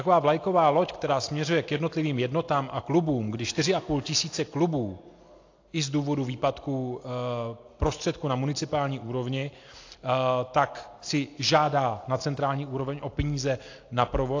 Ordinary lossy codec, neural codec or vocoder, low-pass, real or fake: AAC, 48 kbps; none; 7.2 kHz; real